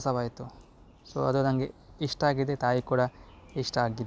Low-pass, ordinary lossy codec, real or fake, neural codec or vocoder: none; none; real; none